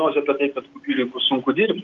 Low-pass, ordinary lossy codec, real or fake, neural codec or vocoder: 7.2 kHz; Opus, 32 kbps; real; none